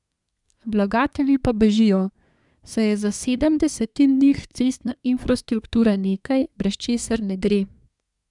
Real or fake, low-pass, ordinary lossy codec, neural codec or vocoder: fake; 10.8 kHz; none; codec, 24 kHz, 1 kbps, SNAC